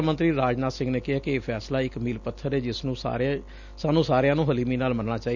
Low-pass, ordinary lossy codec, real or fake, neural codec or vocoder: 7.2 kHz; none; real; none